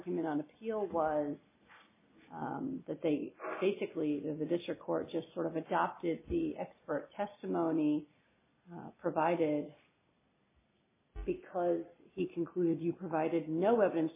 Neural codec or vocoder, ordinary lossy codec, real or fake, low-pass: none; MP3, 16 kbps; real; 3.6 kHz